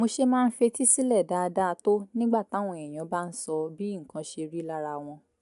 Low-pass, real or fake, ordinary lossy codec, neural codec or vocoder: 10.8 kHz; real; AAC, 96 kbps; none